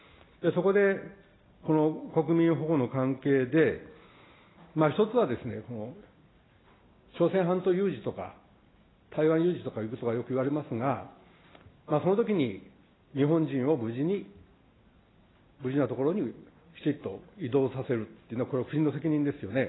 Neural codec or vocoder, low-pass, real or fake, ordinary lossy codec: none; 7.2 kHz; real; AAC, 16 kbps